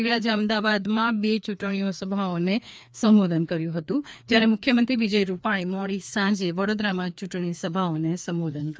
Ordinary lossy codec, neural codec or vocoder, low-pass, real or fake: none; codec, 16 kHz, 2 kbps, FreqCodec, larger model; none; fake